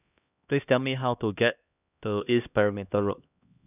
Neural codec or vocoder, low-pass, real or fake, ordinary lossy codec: codec, 16 kHz, 1 kbps, X-Codec, HuBERT features, trained on LibriSpeech; 3.6 kHz; fake; none